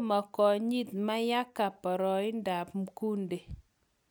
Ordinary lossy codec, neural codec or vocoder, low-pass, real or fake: none; none; none; real